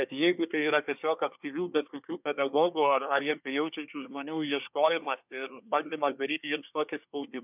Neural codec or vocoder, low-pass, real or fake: codec, 24 kHz, 1 kbps, SNAC; 3.6 kHz; fake